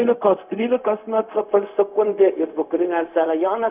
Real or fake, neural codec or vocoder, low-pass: fake; codec, 16 kHz, 0.4 kbps, LongCat-Audio-Codec; 3.6 kHz